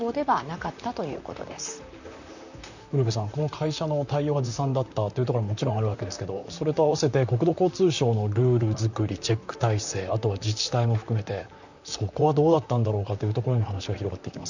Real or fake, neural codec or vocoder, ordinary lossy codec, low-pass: fake; vocoder, 44.1 kHz, 128 mel bands, Pupu-Vocoder; none; 7.2 kHz